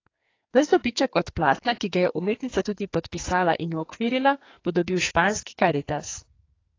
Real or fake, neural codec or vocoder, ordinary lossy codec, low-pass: fake; codec, 44.1 kHz, 2.6 kbps, SNAC; AAC, 32 kbps; 7.2 kHz